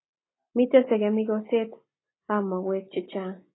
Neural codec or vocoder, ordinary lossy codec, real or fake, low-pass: none; AAC, 16 kbps; real; 7.2 kHz